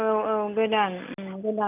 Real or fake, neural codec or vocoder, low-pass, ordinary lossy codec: real; none; 3.6 kHz; none